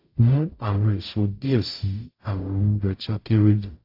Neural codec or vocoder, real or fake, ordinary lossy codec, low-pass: codec, 44.1 kHz, 0.9 kbps, DAC; fake; AAC, 32 kbps; 5.4 kHz